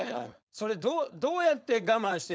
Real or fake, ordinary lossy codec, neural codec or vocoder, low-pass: fake; none; codec, 16 kHz, 4.8 kbps, FACodec; none